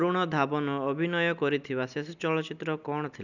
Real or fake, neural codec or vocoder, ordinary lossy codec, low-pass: real; none; none; 7.2 kHz